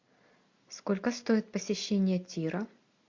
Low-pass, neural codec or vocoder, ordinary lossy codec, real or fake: 7.2 kHz; none; MP3, 48 kbps; real